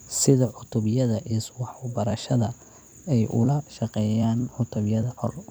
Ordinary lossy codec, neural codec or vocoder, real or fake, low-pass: none; vocoder, 44.1 kHz, 128 mel bands every 256 samples, BigVGAN v2; fake; none